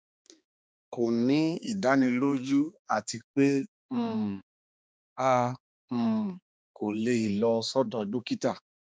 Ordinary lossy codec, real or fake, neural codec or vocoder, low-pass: none; fake; codec, 16 kHz, 2 kbps, X-Codec, HuBERT features, trained on balanced general audio; none